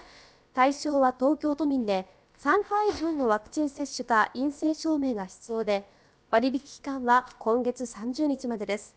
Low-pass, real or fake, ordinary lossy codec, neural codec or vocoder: none; fake; none; codec, 16 kHz, about 1 kbps, DyCAST, with the encoder's durations